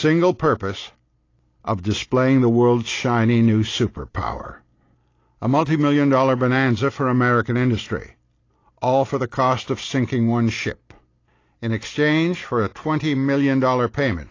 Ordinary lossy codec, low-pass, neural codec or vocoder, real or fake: AAC, 32 kbps; 7.2 kHz; none; real